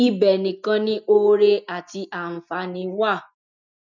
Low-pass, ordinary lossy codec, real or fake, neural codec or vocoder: 7.2 kHz; none; real; none